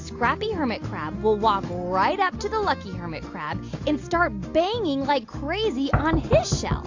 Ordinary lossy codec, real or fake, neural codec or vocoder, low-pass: AAC, 48 kbps; real; none; 7.2 kHz